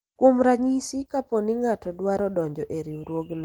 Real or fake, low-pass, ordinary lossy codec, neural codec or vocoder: real; 19.8 kHz; Opus, 24 kbps; none